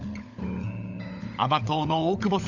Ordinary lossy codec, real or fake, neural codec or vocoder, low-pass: none; fake; codec, 16 kHz, 16 kbps, FunCodec, trained on LibriTTS, 50 frames a second; 7.2 kHz